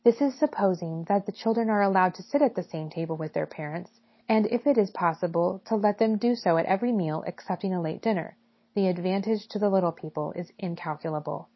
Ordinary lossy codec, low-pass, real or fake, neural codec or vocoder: MP3, 24 kbps; 7.2 kHz; real; none